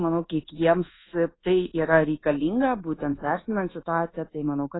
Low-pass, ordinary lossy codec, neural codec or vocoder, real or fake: 7.2 kHz; AAC, 16 kbps; codec, 24 kHz, 1.2 kbps, DualCodec; fake